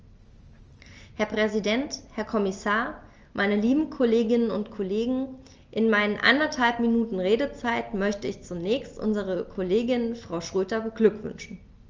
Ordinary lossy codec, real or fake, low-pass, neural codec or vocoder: Opus, 24 kbps; real; 7.2 kHz; none